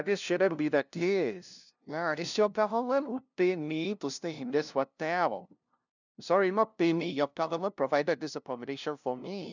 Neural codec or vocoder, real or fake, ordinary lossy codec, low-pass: codec, 16 kHz, 0.5 kbps, FunCodec, trained on LibriTTS, 25 frames a second; fake; none; 7.2 kHz